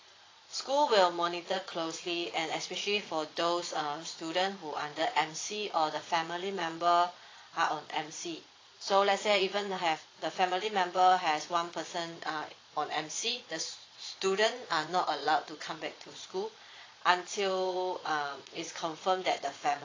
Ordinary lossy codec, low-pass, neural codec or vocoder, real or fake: AAC, 32 kbps; 7.2 kHz; vocoder, 22.05 kHz, 80 mel bands, Vocos; fake